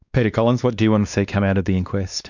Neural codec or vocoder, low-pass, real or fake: codec, 16 kHz, 2 kbps, X-Codec, WavLM features, trained on Multilingual LibriSpeech; 7.2 kHz; fake